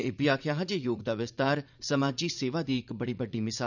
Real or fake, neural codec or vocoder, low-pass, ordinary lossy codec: fake; vocoder, 44.1 kHz, 80 mel bands, Vocos; 7.2 kHz; none